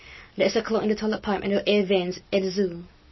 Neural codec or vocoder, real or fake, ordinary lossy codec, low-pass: none; real; MP3, 24 kbps; 7.2 kHz